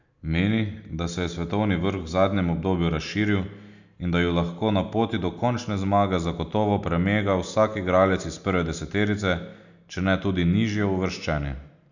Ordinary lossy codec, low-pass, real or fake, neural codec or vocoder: none; 7.2 kHz; real; none